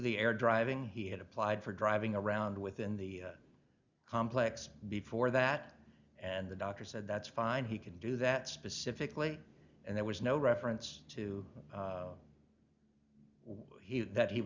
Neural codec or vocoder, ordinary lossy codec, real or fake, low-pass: none; Opus, 64 kbps; real; 7.2 kHz